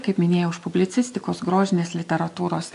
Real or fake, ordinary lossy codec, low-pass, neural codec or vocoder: real; AAC, 64 kbps; 10.8 kHz; none